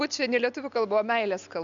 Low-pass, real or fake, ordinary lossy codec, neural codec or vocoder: 7.2 kHz; real; MP3, 96 kbps; none